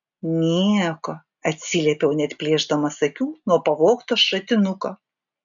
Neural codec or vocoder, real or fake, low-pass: none; real; 7.2 kHz